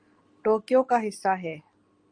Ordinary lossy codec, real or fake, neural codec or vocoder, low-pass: Opus, 32 kbps; real; none; 9.9 kHz